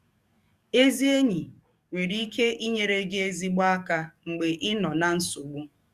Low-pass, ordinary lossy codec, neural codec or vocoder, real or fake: 14.4 kHz; Opus, 64 kbps; codec, 44.1 kHz, 7.8 kbps, DAC; fake